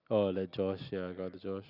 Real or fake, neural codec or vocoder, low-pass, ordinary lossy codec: real; none; 5.4 kHz; none